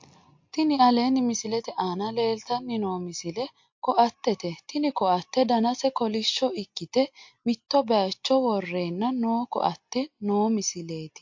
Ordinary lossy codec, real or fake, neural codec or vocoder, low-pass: MP3, 48 kbps; real; none; 7.2 kHz